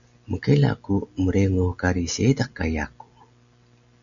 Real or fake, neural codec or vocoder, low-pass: real; none; 7.2 kHz